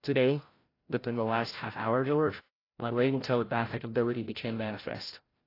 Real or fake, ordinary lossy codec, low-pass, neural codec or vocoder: fake; AAC, 24 kbps; 5.4 kHz; codec, 16 kHz, 0.5 kbps, FreqCodec, larger model